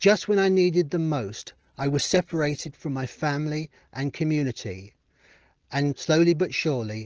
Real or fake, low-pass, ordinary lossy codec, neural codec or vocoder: real; 7.2 kHz; Opus, 16 kbps; none